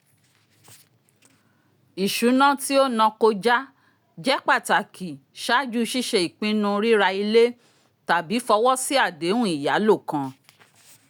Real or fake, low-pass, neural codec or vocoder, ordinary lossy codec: real; none; none; none